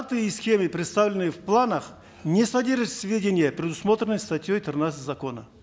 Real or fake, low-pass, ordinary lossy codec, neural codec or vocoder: real; none; none; none